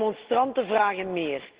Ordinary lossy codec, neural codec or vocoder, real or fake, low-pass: Opus, 16 kbps; none; real; 3.6 kHz